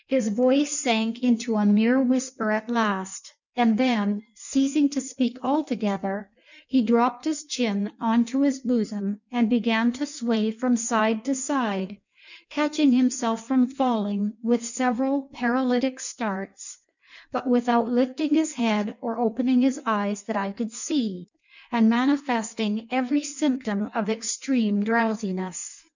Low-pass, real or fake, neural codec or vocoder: 7.2 kHz; fake; codec, 16 kHz in and 24 kHz out, 1.1 kbps, FireRedTTS-2 codec